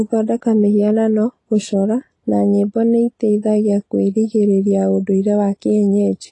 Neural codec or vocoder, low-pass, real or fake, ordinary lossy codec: none; 10.8 kHz; real; AAC, 32 kbps